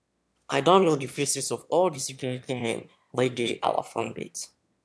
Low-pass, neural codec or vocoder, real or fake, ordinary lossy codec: none; autoencoder, 22.05 kHz, a latent of 192 numbers a frame, VITS, trained on one speaker; fake; none